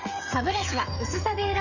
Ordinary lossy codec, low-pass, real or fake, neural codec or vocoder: none; 7.2 kHz; fake; codec, 16 kHz, 16 kbps, FreqCodec, smaller model